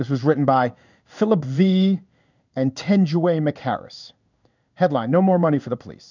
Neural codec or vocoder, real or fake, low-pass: codec, 16 kHz in and 24 kHz out, 1 kbps, XY-Tokenizer; fake; 7.2 kHz